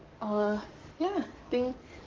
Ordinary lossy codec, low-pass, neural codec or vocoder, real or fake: Opus, 32 kbps; 7.2 kHz; codec, 44.1 kHz, 7.8 kbps, DAC; fake